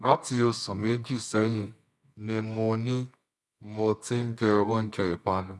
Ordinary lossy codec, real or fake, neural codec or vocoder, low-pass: none; fake; codec, 24 kHz, 0.9 kbps, WavTokenizer, medium music audio release; none